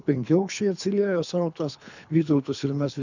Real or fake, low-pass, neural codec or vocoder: fake; 7.2 kHz; codec, 24 kHz, 3 kbps, HILCodec